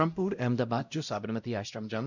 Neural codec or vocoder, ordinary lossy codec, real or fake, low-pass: codec, 16 kHz, 0.5 kbps, X-Codec, WavLM features, trained on Multilingual LibriSpeech; none; fake; 7.2 kHz